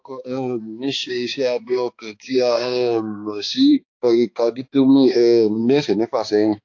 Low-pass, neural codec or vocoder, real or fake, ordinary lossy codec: 7.2 kHz; codec, 16 kHz, 2 kbps, X-Codec, HuBERT features, trained on balanced general audio; fake; AAC, 48 kbps